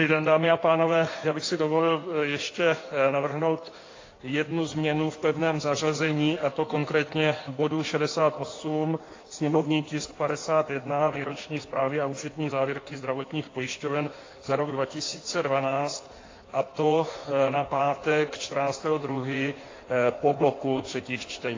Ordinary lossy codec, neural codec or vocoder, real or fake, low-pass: AAC, 32 kbps; codec, 16 kHz in and 24 kHz out, 1.1 kbps, FireRedTTS-2 codec; fake; 7.2 kHz